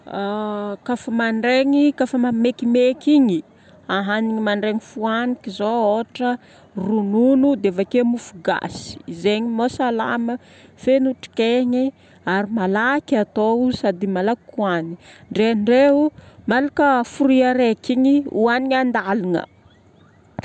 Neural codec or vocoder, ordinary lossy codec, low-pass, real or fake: none; none; 9.9 kHz; real